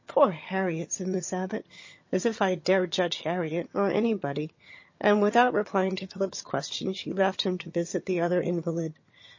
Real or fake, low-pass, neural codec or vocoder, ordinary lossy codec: fake; 7.2 kHz; vocoder, 22.05 kHz, 80 mel bands, HiFi-GAN; MP3, 32 kbps